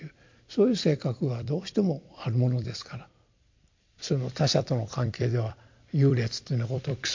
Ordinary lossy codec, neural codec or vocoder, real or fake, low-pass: MP3, 48 kbps; vocoder, 44.1 kHz, 128 mel bands every 256 samples, BigVGAN v2; fake; 7.2 kHz